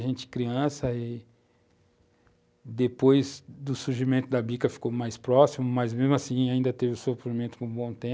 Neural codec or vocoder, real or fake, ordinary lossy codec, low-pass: none; real; none; none